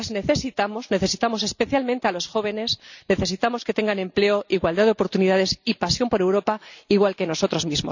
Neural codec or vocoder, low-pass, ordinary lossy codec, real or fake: none; 7.2 kHz; none; real